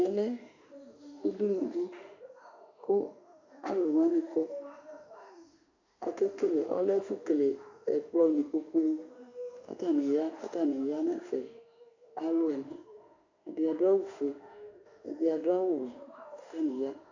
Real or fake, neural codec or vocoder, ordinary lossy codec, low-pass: fake; autoencoder, 48 kHz, 32 numbers a frame, DAC-VAE, trained on Japanese speech; AAC, 48 kbps; 7.2 kHz